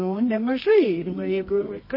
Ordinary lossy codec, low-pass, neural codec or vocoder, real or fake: MP3, 24 kbps; 5.4 kHz; codec, 24 kHz, 0.9 kbps, WavTokenizer, medium music audio release; fake